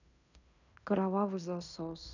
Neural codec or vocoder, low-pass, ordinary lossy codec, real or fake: codec, 16 kHz in and 24 kHz out, 0.9 kbps, LongCat-Audio-Codec, fine tuned four codebook decoder; 7.2 kHz; none; fake